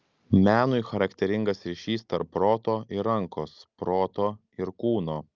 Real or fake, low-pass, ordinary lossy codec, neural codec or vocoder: real; 7.2 kHz; Opus, 32 kbps; none